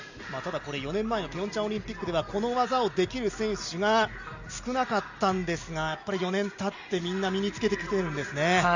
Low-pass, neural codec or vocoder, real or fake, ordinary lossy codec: 7.2 kHz; none; real; none